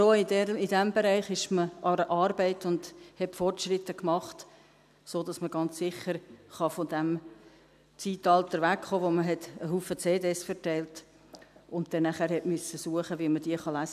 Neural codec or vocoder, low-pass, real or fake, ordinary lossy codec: none; 14.4 kHz; real; none